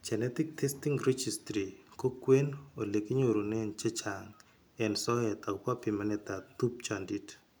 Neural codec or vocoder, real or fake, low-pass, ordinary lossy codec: none; real; none; none